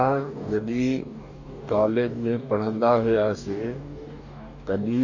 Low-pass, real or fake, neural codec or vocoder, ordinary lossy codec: 7.2 kHz; fake; codec, 44.1 kHz, 2.6 kbps, DAC; none